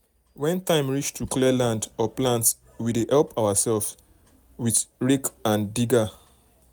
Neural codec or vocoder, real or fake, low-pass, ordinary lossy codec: none; real; none; none